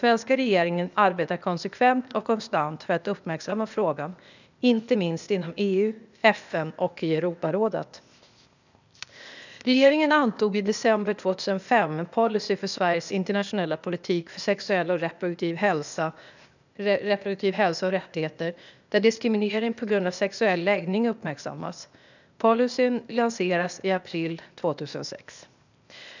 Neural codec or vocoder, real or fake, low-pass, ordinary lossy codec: codec, 16 kHz, 0.8 kbps, ZipCodec; fake; 7.2 kHz; none